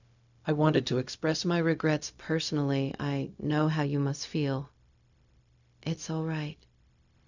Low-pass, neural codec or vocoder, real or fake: 7.2 kHz; codec, 16 kHz, 0.4 kbps, LongCat-Audio-Codec; fake